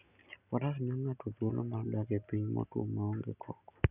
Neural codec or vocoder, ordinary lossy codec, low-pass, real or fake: none; none; 3.6 kHz; real